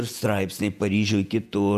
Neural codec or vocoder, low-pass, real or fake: vocoder, 48 kHz, 128 mel bands, Vocos; 14.4 kHz; fake